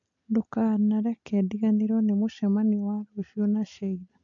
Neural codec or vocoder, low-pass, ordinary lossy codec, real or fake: none; 7.2 kHz; AAC, 64 kbps; real